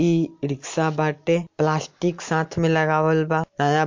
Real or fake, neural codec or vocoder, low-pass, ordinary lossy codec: real; none; 7.2 kHz; MP3, 48 kbps